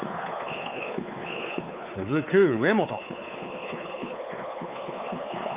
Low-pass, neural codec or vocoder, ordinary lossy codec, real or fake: 3.6 kHz; codec, 16 kHz, 4 kbps, X-Codec, WavLM features, trained on Multilingual LibriSpeech; Opus, 24 kbps; fake